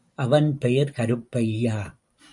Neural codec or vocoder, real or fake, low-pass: vocoder, 44.1 kHz, 128 mel bands every 512 samples, BigVGAN v2; fake; 10.8 kHz